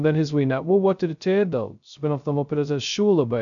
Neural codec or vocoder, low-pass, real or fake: codec, 16 kHz, 0.2 kbps, FocalCodec; 7.2 kHz; fake